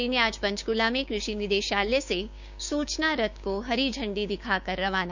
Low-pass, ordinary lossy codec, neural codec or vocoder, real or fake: 7.2 kHz; none; codec, 16 kHz, 6 kbps, DAC; fake